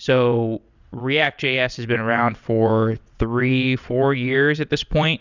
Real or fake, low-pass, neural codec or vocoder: fake; 7.2 kHz; vocoder, 22.05 kHz, 80 mel bands, WaveNeXt